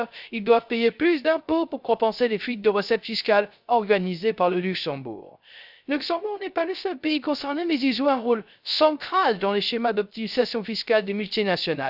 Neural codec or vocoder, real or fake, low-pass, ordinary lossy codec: codec, 16 kHz, 0.3 kbps, FocalCodec; fake; 5.4 kHz; none